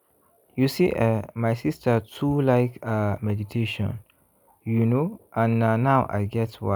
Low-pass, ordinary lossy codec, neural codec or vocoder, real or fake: none; none; none; real